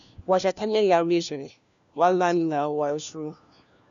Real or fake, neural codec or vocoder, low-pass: fake; codec, 16 kHz, 1 kbps, FunCodec, trained on LibriTTS, 50 frames a second; 7.2 kHz